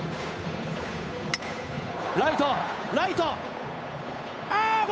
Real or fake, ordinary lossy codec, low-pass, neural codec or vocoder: fake; none; none; codec, 16 kHz, 8 kbps, FunCodec, trained on Chinese and English, 25 frames a second